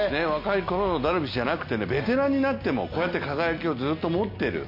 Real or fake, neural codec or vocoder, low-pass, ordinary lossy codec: real; none; 5.4 kHz; MP3, 32 kbps